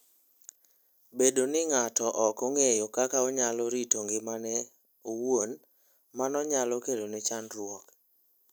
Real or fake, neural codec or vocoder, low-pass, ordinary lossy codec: real; none; none; none